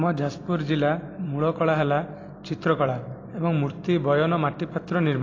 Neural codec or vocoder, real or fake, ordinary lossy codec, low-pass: vocoder, 44.1 kHz, 128 mel bands every 256 samples, BigVGAN v2; fake; AAC, 32 kbps; 7.2 kHz